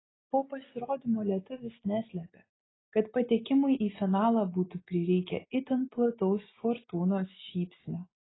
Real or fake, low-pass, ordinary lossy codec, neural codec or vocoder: real; 7.2 kHz; AAC, 16 kbps; none